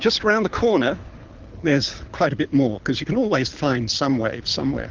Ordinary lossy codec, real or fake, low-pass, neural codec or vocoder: Opus, 32 kbps; fake; 7.2 kHz; codec, 24 kHz, 6 kbps, HILCodec